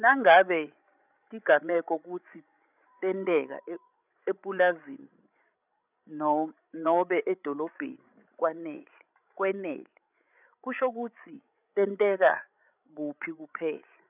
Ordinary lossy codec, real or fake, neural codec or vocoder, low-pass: none; fake; codec, 16 kHz, 16 kbps, FreqCodec, larger model; 3.6 kHz